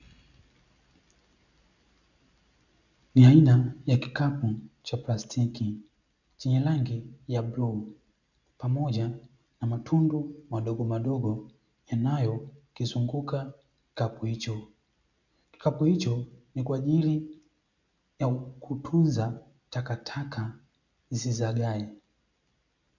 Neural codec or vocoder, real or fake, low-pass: none; real; 7.2 kHz